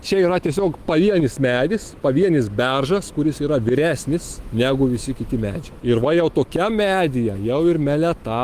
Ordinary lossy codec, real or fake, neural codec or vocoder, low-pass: Opus, 24 kbps; fake; autoencoder, 48 kHz, 128 numbers a frame, DAC-VAE, trained on Japanese speech; 14.4 kHz